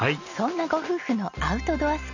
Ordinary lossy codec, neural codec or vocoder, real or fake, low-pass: none; none; real; 7.2 kHz